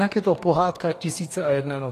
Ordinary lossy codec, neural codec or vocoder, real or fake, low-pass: AAC, 48 kbps; codec, 44.1 kHz, 2.6 kbps, DAC; fake; 14.4 kHz